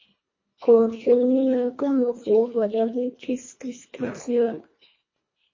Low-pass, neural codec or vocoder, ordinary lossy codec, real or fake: 7.2 kHz; codec, 24 kHz, 1.5 kbps, HILCodec; MP3, 32 kbps; fake